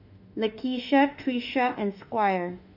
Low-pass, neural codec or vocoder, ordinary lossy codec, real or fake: 5.4 kHz; autoencoder, 48 kHz, 32 numbers a frame, DAC-VAE, trained on Japanese speech; MP3, 48 kbps; fake